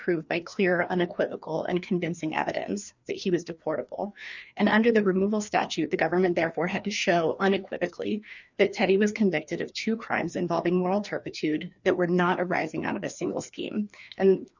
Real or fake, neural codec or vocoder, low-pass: fake; codec, 16 kHz, 2 kbps, FreqCodec, larger model; 7.2 kHz